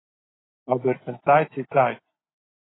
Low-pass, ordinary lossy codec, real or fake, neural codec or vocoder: 7.2 kHz; AAC, 16 kbps; real; none